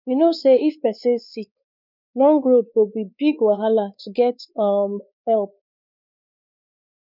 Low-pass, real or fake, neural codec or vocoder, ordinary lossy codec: 5.4 kHz; fake; codec, 16 kHz, 4 kbps, X-Codec, WavLM features, trained on Multilingual LibriSpeech; none